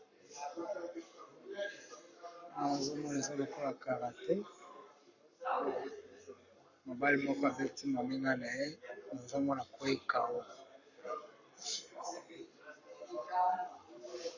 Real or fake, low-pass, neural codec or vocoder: fake; 7.2 kHz; codec, 44.1 kHz, 7.8 kbps, Pupu-Codec